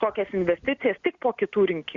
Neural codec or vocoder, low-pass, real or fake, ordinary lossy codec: none; 7.2 kHz; real; Opus, 64 kbps